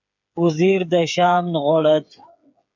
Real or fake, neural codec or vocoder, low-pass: fake; codec, 16 kHz, 8 kbps, FreqCodec, smaller model; 7.2 kHz